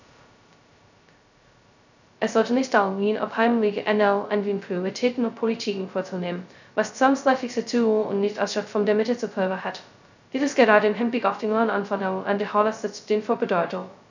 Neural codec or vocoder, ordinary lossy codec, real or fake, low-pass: codec, 16 kHz, 0.2 kbps, FocalCodec; none; fake; 7.2 kHz